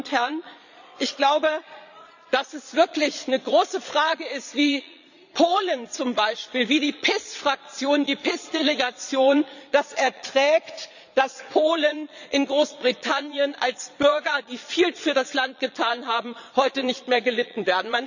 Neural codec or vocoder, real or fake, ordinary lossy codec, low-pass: vocoder, 22.05 kHz, 80 mel bands, Vocos; fake; none; 7.2 kHz